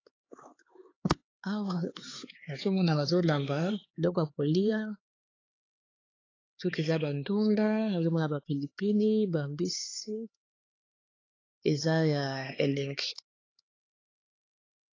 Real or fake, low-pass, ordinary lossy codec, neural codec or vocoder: fake; 7.2 kHz; AAC, 32 kbps; codec, 16 kHz, 4 kbps, X-Codec, HuBERT features, trained on LibriSpeech